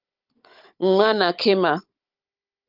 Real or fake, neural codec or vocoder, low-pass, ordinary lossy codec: fake; codec, 16 kHz, 16 kbps, FunCodec, trained on Chinese and English, 50 frames a second; 5.4 kHz; Opus, 32 kbps